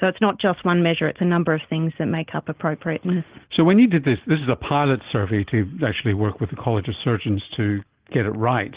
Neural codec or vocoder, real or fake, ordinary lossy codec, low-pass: none; real; Opus, 16 kbps; 3.6 kHz